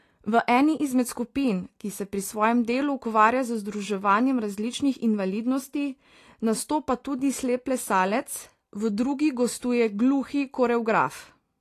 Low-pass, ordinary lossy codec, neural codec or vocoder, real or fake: 14.4 kHz; AAC, 48 kbps; none; real